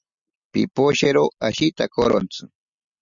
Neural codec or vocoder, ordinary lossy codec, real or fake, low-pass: none; Opus, 64 kbps; real; 7.2 kHz